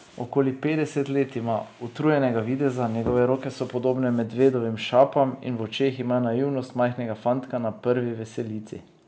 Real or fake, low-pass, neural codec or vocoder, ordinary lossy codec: real; none; none; none